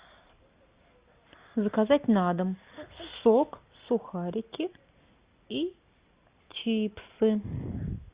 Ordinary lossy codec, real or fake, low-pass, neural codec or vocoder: Opus, 64 kbps; real; 3.6 kHz; none